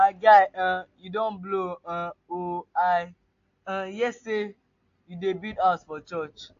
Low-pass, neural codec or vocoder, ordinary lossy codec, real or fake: 7.2 kHz; none; AAC, 48 kbps; real